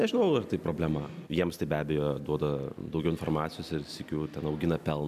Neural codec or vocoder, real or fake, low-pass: none; real; 14.4 kHz